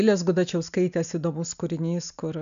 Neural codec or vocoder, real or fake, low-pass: none; real; 7.2 kHz